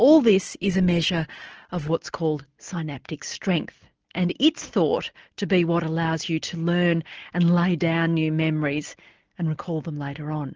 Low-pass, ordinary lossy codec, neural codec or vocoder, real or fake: 7.2 kHz; Opus, 16 kbps; none; real